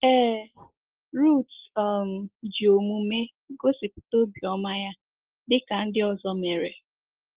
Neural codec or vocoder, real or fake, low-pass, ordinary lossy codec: none; real; 3.6 kHz; Opus, 16 kbps